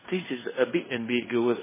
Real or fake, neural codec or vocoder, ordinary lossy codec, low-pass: fake; codec, 16 kHz in and 24 kHz out, 0.9 kbps, LongCat-Audio-Codec, fine tuned four codebook decoder; MP3, 16 kbps; 3.6 kHz